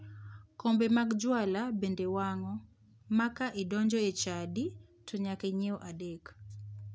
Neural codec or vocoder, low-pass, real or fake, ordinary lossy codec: none; none; real; none